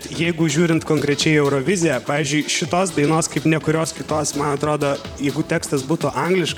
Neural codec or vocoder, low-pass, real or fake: vocoder, 44.1 kHz, 128 mel bands, Pupu-Vocoder; 19.8 kHz; fake